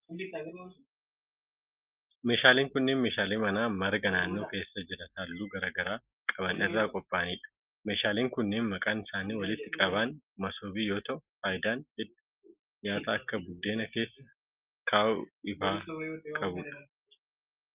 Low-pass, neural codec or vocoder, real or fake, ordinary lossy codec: 3.6 kHz; none; real; Opus, 24 kbps